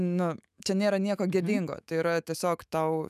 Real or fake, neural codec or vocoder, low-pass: fake; autoencoder, 48 kHz, 128 numbers a frame, DAC-VAE, trained on Japanese speech; 14.4 kHz